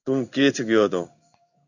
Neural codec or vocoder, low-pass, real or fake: codec, 16 kHz in and 24 kHz out, 1 kbps, XY-Tokenizer; 7.2 kHz; fake